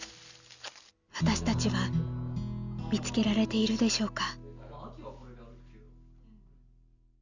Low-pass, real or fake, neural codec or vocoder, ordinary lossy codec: 7.2 kHz; real; none; none